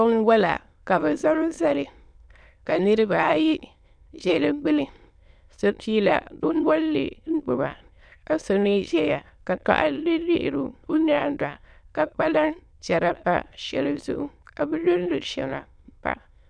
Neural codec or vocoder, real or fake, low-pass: autoencoder, 22.05 kHz, a latent of 192 numbers a frame, VITS, trained on many speakers; fake; 9.9 kHz